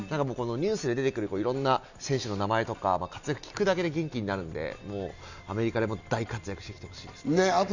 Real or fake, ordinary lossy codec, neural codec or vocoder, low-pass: real; none; none; 7.2 kHz